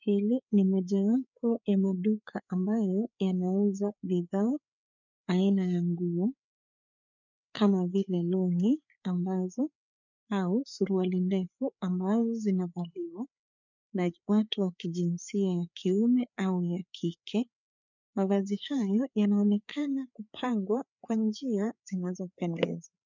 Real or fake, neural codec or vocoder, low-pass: fake; codec, 16 kHz, 4 kbps, FreqCodec, larger model; 7.2 kHz